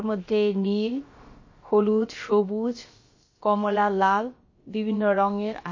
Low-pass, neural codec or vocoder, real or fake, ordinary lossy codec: 7.2 kHz; codec, 16 kHz, about 1 kbps, DyCAST, with the encoder's durations; fake; MP3, 32 kbps